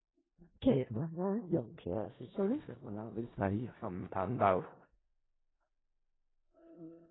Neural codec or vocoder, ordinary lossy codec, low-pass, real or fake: codec, 16 kHz in and 24 kHz out, 0.4 kbps, LongCat-Audio-Codec, four codebook decoder; AAC, 16 kbps; 7.2 kHz; fake